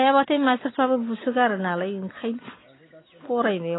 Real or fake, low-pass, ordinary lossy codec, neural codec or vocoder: real; 7.2 kHz; AAC, 16 kbps; none